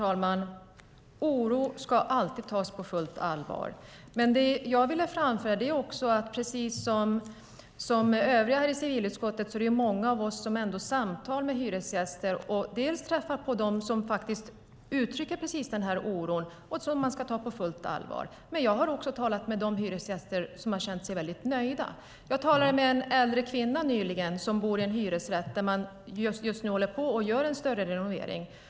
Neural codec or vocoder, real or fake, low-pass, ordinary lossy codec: none; real; none; none